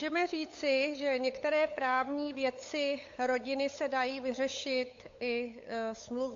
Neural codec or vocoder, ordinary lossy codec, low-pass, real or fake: codec, 16 kHz, 8 kbps, FunCodec, trained on LibriTTS, 25 frames a second; AAC, 48 kbps; 7.2 kHz; fake